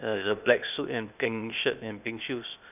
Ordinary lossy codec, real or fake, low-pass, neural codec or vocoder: none; fake; 3.6 kHz; codec, 16 kHz, 0.8 kbps, ZipCodec